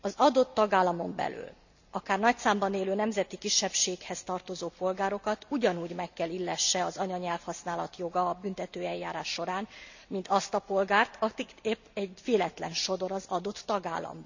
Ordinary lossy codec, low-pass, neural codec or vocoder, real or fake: none; 7.2 kHz; none; real